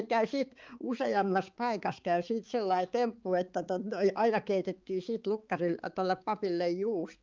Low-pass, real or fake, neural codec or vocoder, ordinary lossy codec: 7.2 kHz; fake; codec, 16 kHz, 4 kbps, X-Codec, HuBERT features, trained on balanced general audio; Opus, 24 kbps